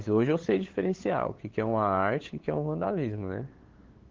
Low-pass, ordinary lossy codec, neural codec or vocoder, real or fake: 7.2 kHz; Opus, 16 kbps; codec, 16 kHz, 8 kbps, FunCodec, trained on LibriTTS, 25 frames a second; fake